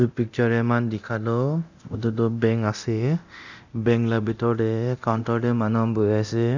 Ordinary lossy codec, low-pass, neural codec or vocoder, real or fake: none; 7.2 kHz; codec, 24 kHz, 0.9 kbps, DualCodec; fake